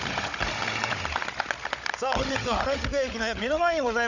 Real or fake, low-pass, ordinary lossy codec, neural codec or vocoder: fake; 7.2 kHz; none; codec, 16 kHz, 16 kbps, FunCodec, trained on Chinese and English, 50 frames a second